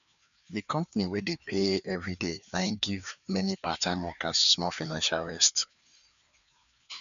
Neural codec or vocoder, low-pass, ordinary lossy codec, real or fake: codec, 16 kHz, 2 kbps, FreqCodec, larger model; 7.2 kHz; none; fake